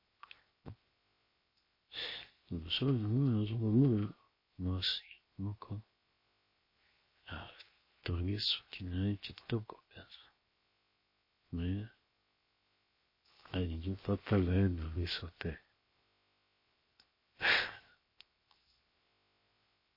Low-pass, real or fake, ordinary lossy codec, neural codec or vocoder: 5.4 kHz; fake; MP3, 24 kbps; codec, 16 kHz, 0.7 kbps, FocalCodec